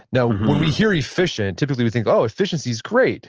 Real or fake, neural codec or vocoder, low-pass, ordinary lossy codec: real; none; 7.2 kHz; Opus, 16 kbps